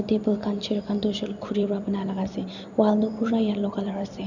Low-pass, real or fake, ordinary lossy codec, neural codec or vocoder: 7.2 kHz; fake; none; vocoder, 44.1 kHz, 128 mel bands every 256 samples, BigVGAN v2